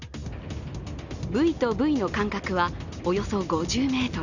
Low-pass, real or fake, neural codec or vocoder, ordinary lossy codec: 7.2 kHz; real; none; none